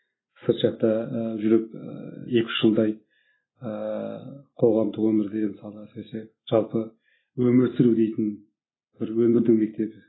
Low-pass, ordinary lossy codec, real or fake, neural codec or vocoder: 7.2 kHz; AAC, 16 kbps; real; none